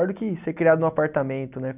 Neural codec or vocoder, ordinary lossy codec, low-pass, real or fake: none; none; 3.6 kHz; real